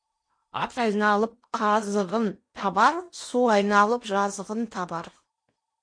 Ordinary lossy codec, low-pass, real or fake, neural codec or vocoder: MP3, 48 kbps; 9.9 kHz; fake; codec, 16 kHz in and 24 kHz out, 0.8 kbps, FocalCodec, streaming, 65536 codes